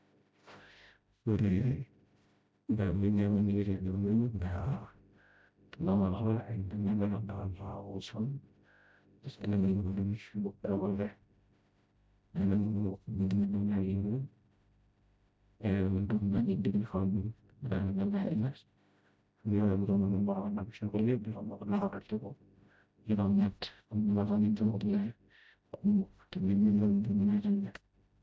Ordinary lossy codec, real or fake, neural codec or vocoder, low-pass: none; fake; codec, 16 kHz, 0.5 kbps, FreqCodec, smaller model; none